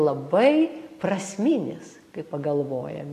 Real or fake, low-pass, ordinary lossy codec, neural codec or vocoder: real; 14.4 kHz; AAC, 48 kbps; none